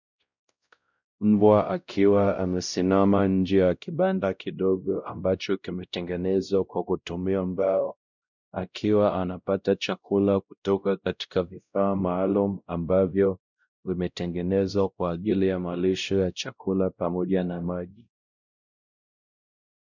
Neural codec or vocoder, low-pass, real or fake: codec, 16 kHz, 0.5 kbps, X-Codec, WavLM features, trained on Multilingual LibriSpeech; 7.2 kHz; fake